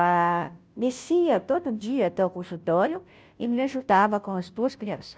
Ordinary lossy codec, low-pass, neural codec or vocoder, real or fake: none; none; codec, 16 kHz, 0.5 kbps, FunCodec, trained on Chinese and English, 25 frames a second; fake